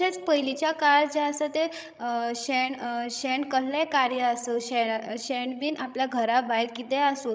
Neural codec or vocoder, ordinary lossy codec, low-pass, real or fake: codec, 16 kHz, 16 kbps, FreqCodec, larger model; none; none; fake